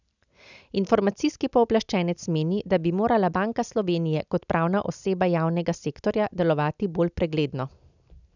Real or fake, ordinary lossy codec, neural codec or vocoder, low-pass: real; none; none; 7.2 kHz